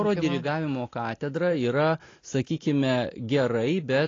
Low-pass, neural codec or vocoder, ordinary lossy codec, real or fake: 7.2 kHz; none; AAC, 32 kbps; real